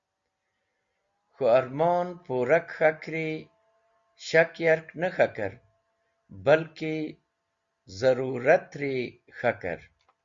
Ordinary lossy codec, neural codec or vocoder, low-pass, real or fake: Opus, 64 kbps; none; 7.2 kHz; real